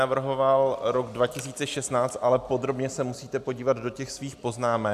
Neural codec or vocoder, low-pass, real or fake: none; 14.4 kHz; real